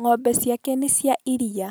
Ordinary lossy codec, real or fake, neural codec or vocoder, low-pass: none; real; none; none